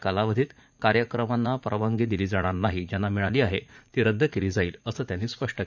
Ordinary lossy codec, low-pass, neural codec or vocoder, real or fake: none; 7.2 kHz; vocoder, 44.1 kHz, 80 mel bands, Vocos; fake